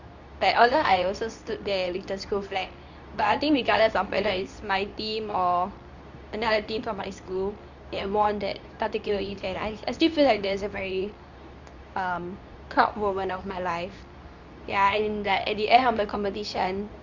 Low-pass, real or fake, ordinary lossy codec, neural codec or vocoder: 7.2 kHz; fake; none; codec, 24 kHz, 0.9 kbps, WavTokenizer, medium speech release version 2